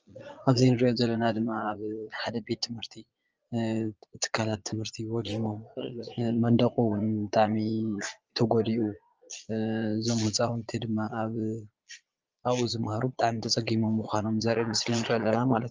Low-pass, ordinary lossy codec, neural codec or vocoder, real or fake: 7.2 kHz; Opus, 24 kbps; vocoder, 22.05 kHz, 80 mel bands, Vocos; fake